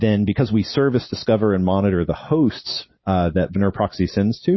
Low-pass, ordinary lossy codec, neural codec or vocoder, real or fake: 7.2 kHz; MP3, 24 kbps; none; real